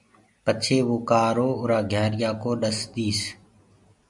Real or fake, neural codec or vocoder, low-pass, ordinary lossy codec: real; none; 10.8 kHz; MP3, 64 kbps